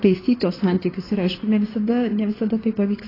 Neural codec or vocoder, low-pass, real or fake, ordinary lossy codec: codec, 16 kHz in and 24 kHz out, 2.2 kbps, FireRedTTS-2 codec; 5.4 kHz; fake; AAC, 24 kbps